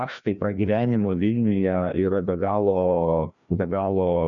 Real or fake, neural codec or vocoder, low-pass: fake; codec, 16 kHz, 1 kbps, FreqCodec, larger model; 7.2 kHz